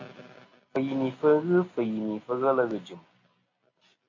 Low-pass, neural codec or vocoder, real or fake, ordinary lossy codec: 7.2 kHz; none; real; AAC, 32 kbps